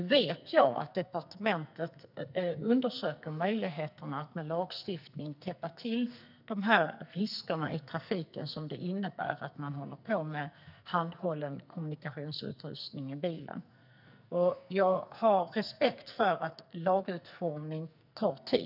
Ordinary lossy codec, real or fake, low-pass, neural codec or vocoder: none; fake; 5.4 kHz; codec, 44.1 kHz, 2.6 kbps, SNAC